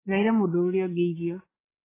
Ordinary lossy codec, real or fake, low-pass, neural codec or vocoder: AAC, 16 kbps; fake; 3.6 kHz; codec, 16 kHz, 16 kbps, FreqCodec, larger model